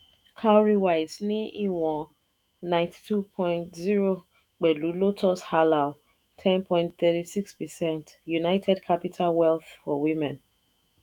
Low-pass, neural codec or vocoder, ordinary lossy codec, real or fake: 19.8 kHz; autoencoder, 48 kHz, 128 numbers a frame, DAC-VAE, trained on Japanese speech; none; fake